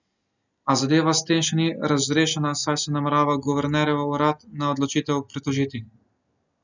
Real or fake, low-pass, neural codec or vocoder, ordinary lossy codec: real; 7.2 kHz; none; none